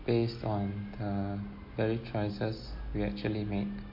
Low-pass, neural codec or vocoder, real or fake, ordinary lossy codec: 5.4 kHz; none; real; MP3, 32 kbps